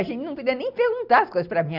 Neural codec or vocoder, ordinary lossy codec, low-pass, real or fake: none; none; 5.4 kHz; real